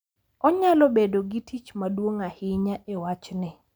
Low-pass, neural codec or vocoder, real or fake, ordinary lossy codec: none; none; real; none